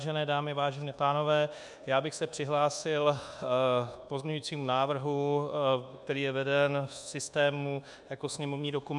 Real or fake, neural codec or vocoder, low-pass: fake; codec, 24 kHz, 1.2 kbps, DualCodec; 10.8 kHz